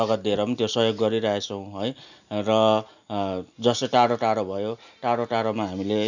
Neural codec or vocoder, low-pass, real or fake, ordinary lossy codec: none; 7.2 kHz; real; none